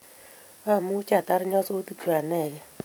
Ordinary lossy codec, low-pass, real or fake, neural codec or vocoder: none; none; fake; vocoder, 44.1 kHz, 128 mel bands every 512 samples, BigVGAN v2